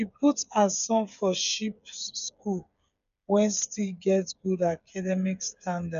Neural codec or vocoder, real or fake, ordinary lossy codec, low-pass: codec, 16 kHz, 8 kbps, FreqCodec, smaller model; fake; none; 7.2 kHz